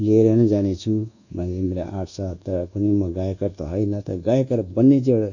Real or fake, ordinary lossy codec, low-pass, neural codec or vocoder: fake; none; 7.2 kHz; codec, 24 kHz, 1.2 kbps, DualCodec